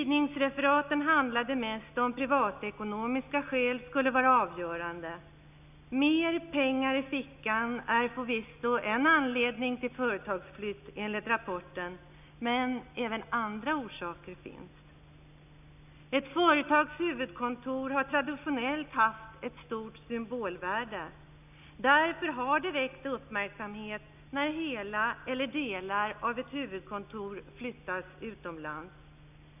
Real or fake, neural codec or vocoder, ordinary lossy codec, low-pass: real; none; none; 3.6 kHz